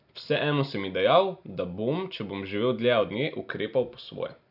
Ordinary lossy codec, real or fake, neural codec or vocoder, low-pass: none; real; none; 5.4 kHz